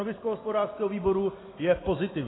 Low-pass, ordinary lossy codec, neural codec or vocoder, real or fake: 7.2 kHz; AAC, 16 kbps; none; real